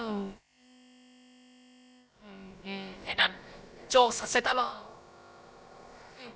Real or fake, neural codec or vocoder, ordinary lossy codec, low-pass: fake; codec, 16 kHz, about 1 kbps, DyCAST, with the encoder's durations; none; none